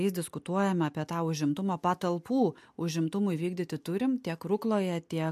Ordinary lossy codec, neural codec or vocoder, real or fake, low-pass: MP3, 64 kbps; none; real; 14.4 kHz